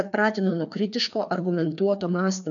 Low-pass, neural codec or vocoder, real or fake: 7.2 kHz; codec, 16 kHz, 2 kbps, FreqCodec, larger model; fake